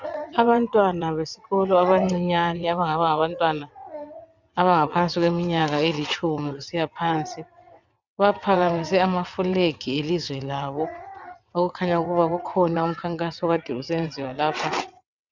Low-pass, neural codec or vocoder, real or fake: 7.2 kHz; vocoder, 22.05 kHz, 80 mel bands, WaveNeXt; fake